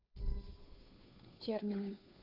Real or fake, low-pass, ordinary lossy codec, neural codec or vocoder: fake; 5.4 kHz; none; codec, 16 kHz, 2 kbps, FunCodec, trained on Chinese and English, 25 frames a second